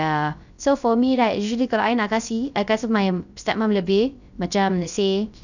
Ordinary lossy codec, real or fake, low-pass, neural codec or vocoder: none; fake; 7.2 kHz; codec, 16 kHz, 0.3 kbps, FocalCodec